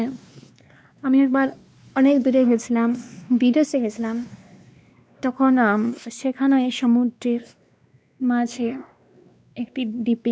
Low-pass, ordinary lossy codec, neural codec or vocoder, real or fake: none; none; codec, 16 kHz, 2 kbps, X-Codec, WavLM features, trained on Multilingual LibriSpeech; fake